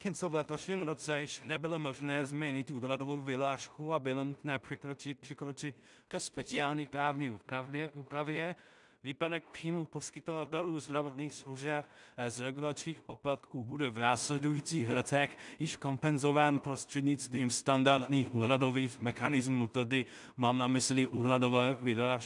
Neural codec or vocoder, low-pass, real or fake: codec, 16 kHz in and 24 kHz out, 0.4 kbps, LongCat-Audio-Codec, two codebook decoder; 10.8 kHz; fake